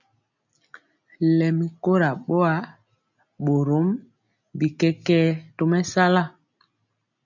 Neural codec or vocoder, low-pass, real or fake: none; 7.2 kHz; real